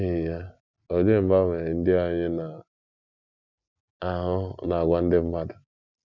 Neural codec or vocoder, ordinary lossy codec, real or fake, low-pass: none; none; real; 7.2 kHz